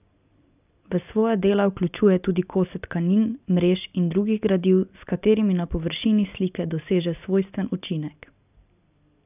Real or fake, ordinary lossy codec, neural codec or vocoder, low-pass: real; none; none; 3.6 kHz